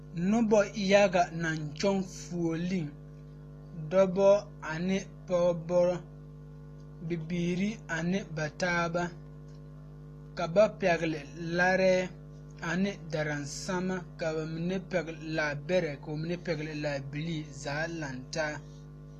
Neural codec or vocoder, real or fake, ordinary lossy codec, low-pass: none; real; AAC, 48 kbps; 14.4 kHz